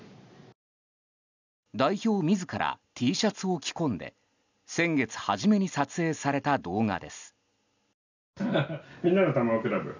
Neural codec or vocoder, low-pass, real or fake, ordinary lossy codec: none; 7.2 kHz; real; none